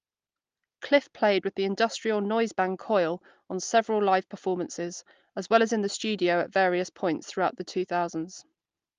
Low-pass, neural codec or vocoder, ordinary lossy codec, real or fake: 7.2 kHz; none; Opus, 32 kbps; real